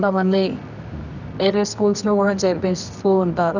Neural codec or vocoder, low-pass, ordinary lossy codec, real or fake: codec, 24 kHz, 0.9 kbps, WavTokenizer, medium music audio release; 7.2 kHz; none; fake